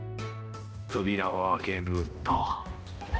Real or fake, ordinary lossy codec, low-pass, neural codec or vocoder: fake; none; none; codec, 16 kHz, 1 kbps, X-Codec, HuBERT features, trained on balanced general audio